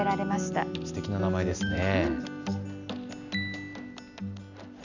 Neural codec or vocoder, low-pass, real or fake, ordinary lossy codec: none; 7.2 kHz; real; none